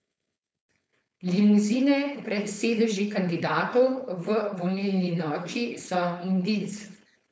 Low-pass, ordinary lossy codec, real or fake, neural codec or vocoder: none; none; fake; codec, 16 kHz, 4.8 kbps, FACodec